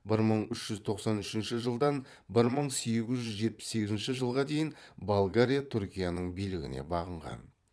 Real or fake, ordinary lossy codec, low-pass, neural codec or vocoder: fake; none; none; vocoder, 22.05 kHz, 80 mel bands, Vocos